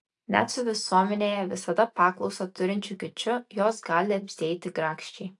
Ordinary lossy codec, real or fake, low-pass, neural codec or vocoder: AAC, 64 kbps; real; 10.8 kHz; none